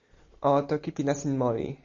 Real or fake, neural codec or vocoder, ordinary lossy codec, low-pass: real; none; Opus, 64 kbps; 7.2 kHz